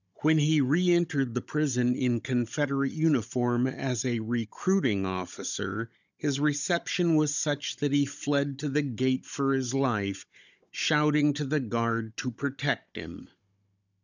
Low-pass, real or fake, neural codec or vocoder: 7.2 kHz; fake; codec, 16 kHz, 16 kbps, FunCodec, trained on Chinese and English, 50 frames a second